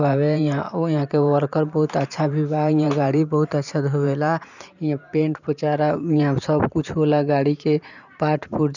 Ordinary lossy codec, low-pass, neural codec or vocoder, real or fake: none; 7.2 kHz; vocoder, 44.1 kHz, 128 mel bands every 512 samples, BigVGAN v2; fake